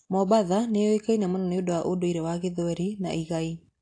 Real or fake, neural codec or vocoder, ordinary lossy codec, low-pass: real; none; AAC, 48 kbps; 9.9 kHz